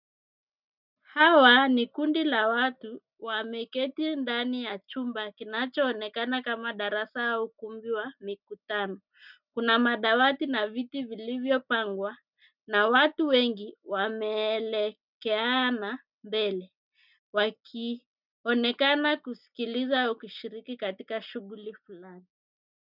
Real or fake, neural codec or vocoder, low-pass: real; none; 5.4 kHz